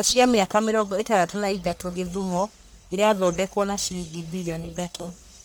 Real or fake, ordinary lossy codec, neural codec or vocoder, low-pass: fake; none; codec, 44.1 kHz, 1.7 kbps, Pupu-Codec; none